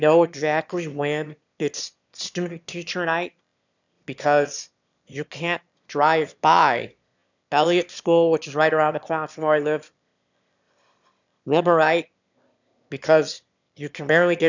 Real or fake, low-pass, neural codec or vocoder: fake; 7.2 kHz; autoencoder, 22.05 kHz, a latent of 192 numbers a frame, VITS, trained on one speaker